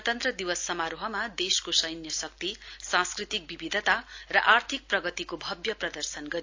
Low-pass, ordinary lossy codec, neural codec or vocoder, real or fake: 7.2 kHz; AAC, 48 kbps; none; real